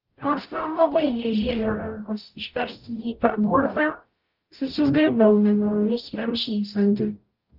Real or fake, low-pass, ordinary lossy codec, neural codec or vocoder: fake; 5.4 kHz; Opus, 32 kbps; codec, 44.1 kHz, 0.9 kbps, DAC